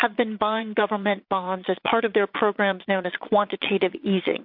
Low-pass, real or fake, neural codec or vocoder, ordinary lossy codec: 5.4 kHz; fake; vocoder, 22.05 kHz, 80 mel bands, Vocos; MP3, 48 kbps